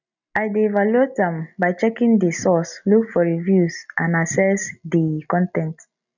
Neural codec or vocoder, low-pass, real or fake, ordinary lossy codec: none; 7.2 kHz; real; none